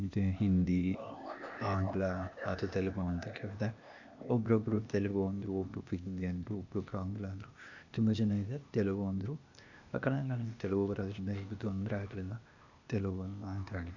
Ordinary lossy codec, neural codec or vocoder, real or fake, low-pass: none; codec, 16 kHz, 0.8 kbps, ZipCodec; fake; 7.2 kHz